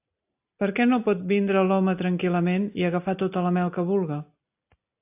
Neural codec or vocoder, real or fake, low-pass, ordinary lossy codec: none; real; 3.6 kHz; AAC, 32 kbps